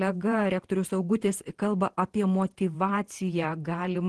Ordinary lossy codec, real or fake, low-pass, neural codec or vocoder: Opus, 16 kbps; fake; 10.8 kHz; vocoder, 48 kHz, 128 mel bands, Vocos